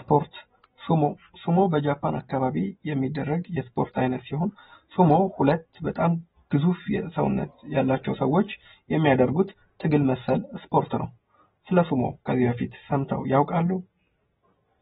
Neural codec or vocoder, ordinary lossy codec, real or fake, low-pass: none; AAC, 16 kbps; real; 19.8 kHz